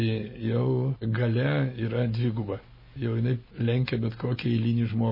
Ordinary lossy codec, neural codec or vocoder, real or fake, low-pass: MP3, 24 kbps; none; real; 5.4 kHz